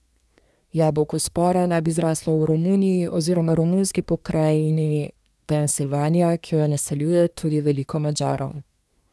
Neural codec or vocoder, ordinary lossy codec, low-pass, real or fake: codec, 24 kHz, 1 kbps, SNAC; none; none; fake